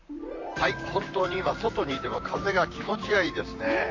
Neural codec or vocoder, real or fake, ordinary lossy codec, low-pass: vocoder, 44.1 kHz, 128 mel bands, Pupu-Vocoder; fake; AAC, 48 kbps; 7.2 kHz